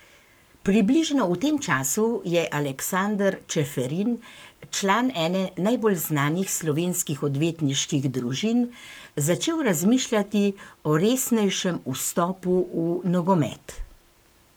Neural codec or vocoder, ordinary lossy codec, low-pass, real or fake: codec, 44.1 kHz, 7.8 kbps, Pupu-Codec; none; none; fake